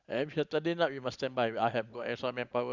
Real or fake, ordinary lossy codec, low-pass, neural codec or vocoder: real; Opus, 64 kbps; 7.2 kHz; none